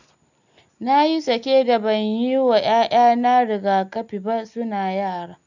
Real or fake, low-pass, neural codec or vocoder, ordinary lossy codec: real; 7.2 kHz; none; none